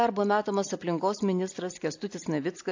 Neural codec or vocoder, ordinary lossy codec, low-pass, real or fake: none; AAC, 48 kbps; 7.2 kHz; real